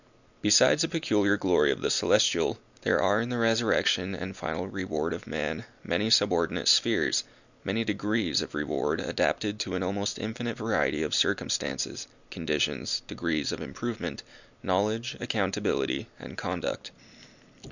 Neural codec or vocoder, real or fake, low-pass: none; real; 7.2 kHz